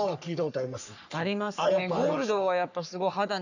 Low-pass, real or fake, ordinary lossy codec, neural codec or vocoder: 7.2 kHz; fake; none; codec, 44.1 kHz, 3.4 kbps, Pupu-Codec